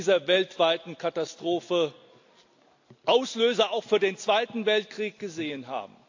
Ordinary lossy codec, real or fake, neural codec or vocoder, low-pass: AAC, 48 kbps; real; none; 7.2 kHz